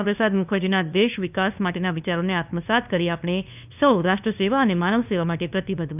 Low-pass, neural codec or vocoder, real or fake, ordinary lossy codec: 3.6 kHz; codec, 16 kHz, 2 kbps, FunCodec, trained on LibriTTS, 25 frames a second; fake; none